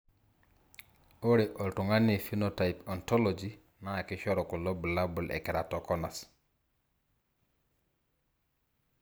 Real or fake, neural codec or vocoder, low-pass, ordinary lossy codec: real; none; none; none